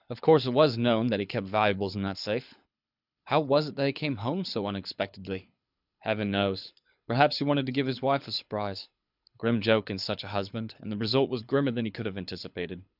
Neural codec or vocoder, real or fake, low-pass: codec, 24 kHz, 6 kbps, HILCodec; fake; 5.4 kHz